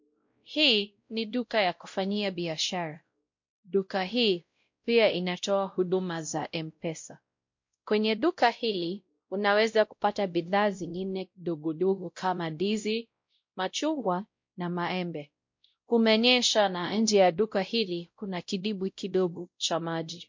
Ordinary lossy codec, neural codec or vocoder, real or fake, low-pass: MP3, 48 kbps; codec, 16 kHz, 0.5 kbps, X-Codec, WavLM features, trained on Multilingual LibriSpeech; fake; 7.2 kHz